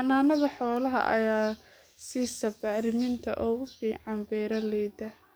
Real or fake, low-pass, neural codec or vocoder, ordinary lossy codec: fake; none; codec, 44.1 kHz, 7.8 kbps, DAC; none